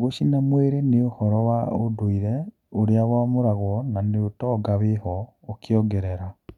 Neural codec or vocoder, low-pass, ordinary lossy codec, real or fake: none; 19.8 kHz; none; real